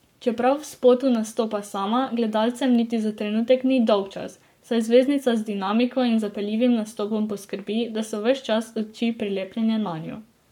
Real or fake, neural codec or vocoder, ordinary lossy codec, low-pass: fake; codec, 44.1 kHz, 7.8 kbps, Pupu-Codec; none; 19.8 kHz